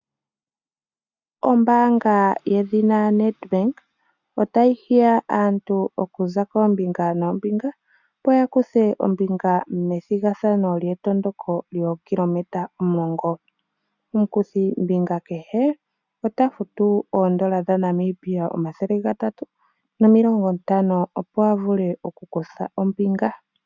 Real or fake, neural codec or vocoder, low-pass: real; none; 7.2 kHz